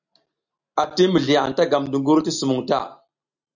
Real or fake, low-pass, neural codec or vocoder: real; 7.2 kHz; none